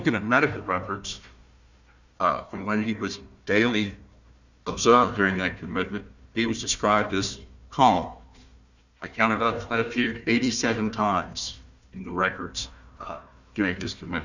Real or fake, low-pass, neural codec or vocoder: fake; 7.2 kHz; codec, 16 kHz, 1 kbps, FunCodec, trained on Chinese and English, 50 frames a second